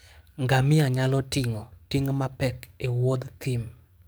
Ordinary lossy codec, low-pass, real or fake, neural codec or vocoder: none; none; fake; codec, 44.1 kHz, 7.8 kbps, DAC